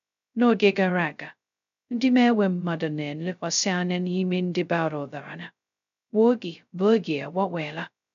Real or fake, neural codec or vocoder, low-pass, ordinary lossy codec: fake; codec, 16 kHz, 0.2 kbps, FocalCodec; 7.2 kHz; none